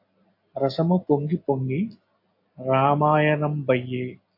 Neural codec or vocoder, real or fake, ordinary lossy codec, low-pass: none; real; AAC, 32 kbps; 5.4 kHz